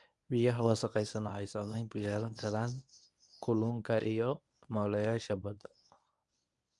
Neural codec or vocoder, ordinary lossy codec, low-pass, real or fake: codec, 24 kHz, 0.9 kbps, WavTokenizer, medium speech release version 1; MP3, 96 kbps; 10.8 kHz; fake